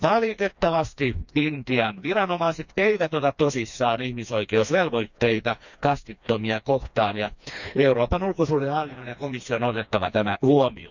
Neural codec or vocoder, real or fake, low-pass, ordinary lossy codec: codec, 16 kHz, 2 kbps, FreqCodec, smaller model; fake; 7.2 kHz; none